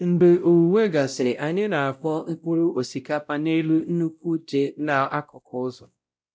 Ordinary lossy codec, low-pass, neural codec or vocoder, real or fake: none; none; codec, 16 kHz, 0.5 kbps, X-Codec, WavLM features, trained on Multilingual LibriSpeech; fake